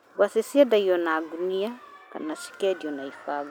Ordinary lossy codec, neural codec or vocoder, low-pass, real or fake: none; none; none; real